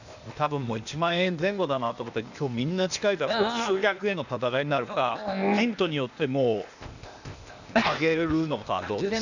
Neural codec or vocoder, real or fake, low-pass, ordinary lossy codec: codec, 16 kHz, 0.8 kbps, ZipCodec; fake; 7.2 kHz; none